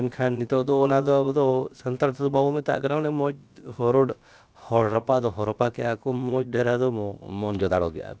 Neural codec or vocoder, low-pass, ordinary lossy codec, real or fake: codec, 16 kHz, about 1 kbps, DyCAST, with the encoder's durations; none; none; fake